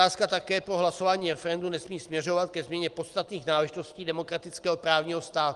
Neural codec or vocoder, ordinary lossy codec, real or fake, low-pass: none; Opus, 24 kbps; real; 10.8 kHz